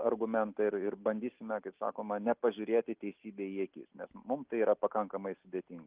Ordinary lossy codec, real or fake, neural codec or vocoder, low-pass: Opus, 32 kbps; real; none; 3.6 kHz